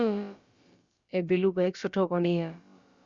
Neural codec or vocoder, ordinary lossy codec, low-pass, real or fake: codec, 16 kHz, about 1 kbps, DyCAST, with the encoder's durations; Opus, 64 kbps; 7.2 kHz; fake